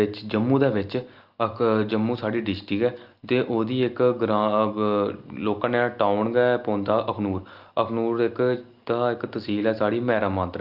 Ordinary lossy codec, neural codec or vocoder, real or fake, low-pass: Opus, 32 kbps; none; real; 5.4 kHz